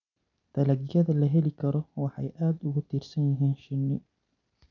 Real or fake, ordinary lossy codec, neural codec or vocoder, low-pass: real; none; none; 7.2 kHz